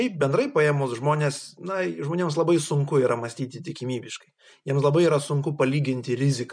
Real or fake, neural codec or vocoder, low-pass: real; none; 9.9 kHz